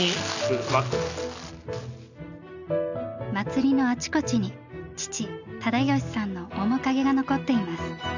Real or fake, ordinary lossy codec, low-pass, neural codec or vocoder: real; none; 7.2 kHz; none